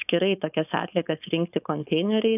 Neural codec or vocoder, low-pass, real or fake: codec, 16 kHz, 4.8 kbps, FACodec; 3.6 kHz; fake